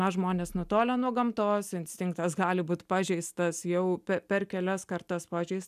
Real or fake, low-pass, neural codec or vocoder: real; 14.4 kHz; none